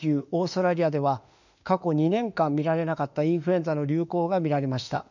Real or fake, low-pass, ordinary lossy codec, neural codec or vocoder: fake; 7.2 kHz; none; autoencoder, 48 kHz, 32 numbers a frame, DAC-VAE, trained on Japanese speech